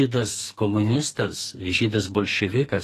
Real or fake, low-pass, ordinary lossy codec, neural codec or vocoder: fake; 14.4 kHz; AAC, 48 kbps; codec, 32 kHz, 1.9 kbps, SNAC